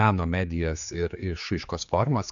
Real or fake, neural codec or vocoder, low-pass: fake; codec, 16 kHz, 4 kbps, X-Codec, HuBERT features, trained on general audio; 7.2 kHz